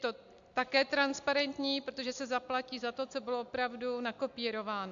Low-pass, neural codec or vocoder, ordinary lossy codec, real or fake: 7.2 kHz; none; MP3, 64 kbps; real